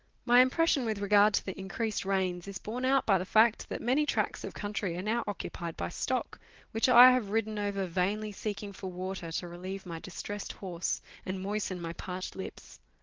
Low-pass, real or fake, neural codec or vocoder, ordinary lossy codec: 7.2 kHz; real; none; Opus, 16 kbps